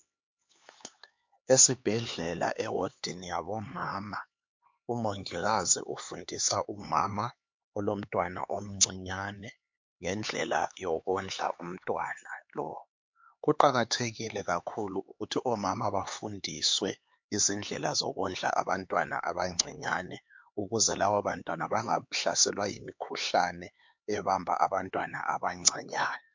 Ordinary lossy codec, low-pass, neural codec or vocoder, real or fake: MP3, 48 kbps; 7.2 kHz; codec, 16 kHz, 4 kbps, X-Codec, HuBERT features, trained on LibriSpeech; fake